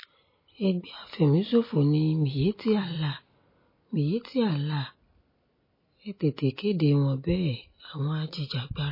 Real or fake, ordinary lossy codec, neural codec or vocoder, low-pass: real; MP3, 24 kbps; none; 5.4 kHz